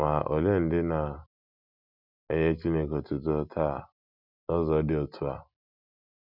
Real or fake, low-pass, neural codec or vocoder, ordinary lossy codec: real; 5.4 kHz; none; none